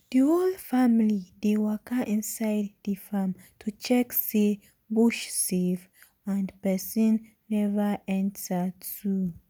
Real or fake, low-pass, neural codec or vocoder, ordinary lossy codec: real; none; none; none